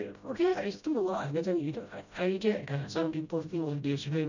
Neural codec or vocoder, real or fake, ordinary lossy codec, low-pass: codec, 16 kHz, 0.5 kbps, FreqCodec, smaller model; fake; none; 7.2 kHz